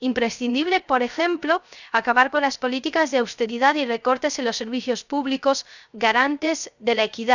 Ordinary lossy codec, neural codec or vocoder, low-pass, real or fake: none; codec, 16 kHz, 0.3 kbps, FocalCodec; 7.2 kHz; fake